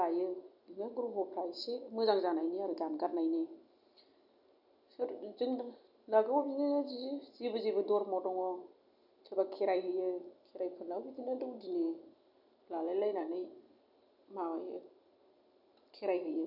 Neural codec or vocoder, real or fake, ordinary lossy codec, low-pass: none; real; none; 5.4 kHz